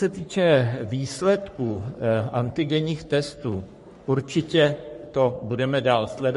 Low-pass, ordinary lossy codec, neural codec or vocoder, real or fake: 14.4 kHz; MP3, 48 kbps; codec, 44.1 kHz, 3.4 kbps, Pupu-Codec; fake